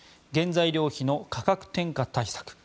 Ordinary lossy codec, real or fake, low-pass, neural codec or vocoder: none; real; none; none